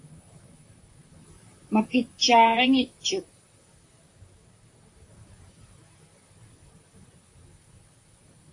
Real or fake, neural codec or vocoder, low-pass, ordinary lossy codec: fake; vocoder, 44.1 kHz, 128 mel bands, Pupu-Vocoder; 10.8 kHz; AAC, 48 kbps